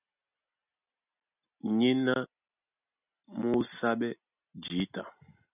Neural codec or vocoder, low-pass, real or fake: none; 3.6 kHz; real